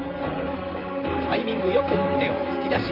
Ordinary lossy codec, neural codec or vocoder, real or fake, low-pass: none; vocoder, 44.1 kHz, 128 mel bands, Pupu-Vocoder; fake; 5.4 kHz